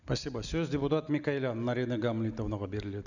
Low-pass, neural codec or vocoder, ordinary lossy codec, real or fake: 7.2 kHz; none; none; real